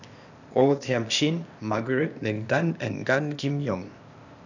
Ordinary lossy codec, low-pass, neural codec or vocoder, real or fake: none; 7.2 kHz; codec, 16 kHz, 0.8 kbps, ZipCodec; fake